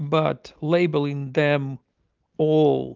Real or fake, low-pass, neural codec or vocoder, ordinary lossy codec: real; 7.2 kHz; none; Opus, 32 kbps